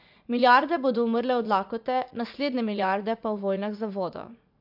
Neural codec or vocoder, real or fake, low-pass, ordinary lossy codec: vocoder, 44.1 kHz, 80 mel bands, Vocos; fake; 5.4 kHz; MP3, 48 kbps